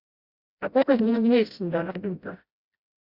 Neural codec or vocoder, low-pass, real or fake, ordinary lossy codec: codec, 16 kHz, 0.5 kbps, FreqCodec, smaller model; 5.4 kHz; fake; Opus, 64 kbps